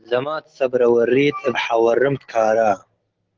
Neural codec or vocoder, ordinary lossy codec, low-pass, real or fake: none; Opus, 16 kbps; 7.2 kHz; real